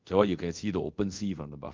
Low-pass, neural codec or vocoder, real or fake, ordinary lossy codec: 7.2 kHz; codec, 24 kHz, 0.5 kbps, DualCodec; fake; Opus, 24 kbps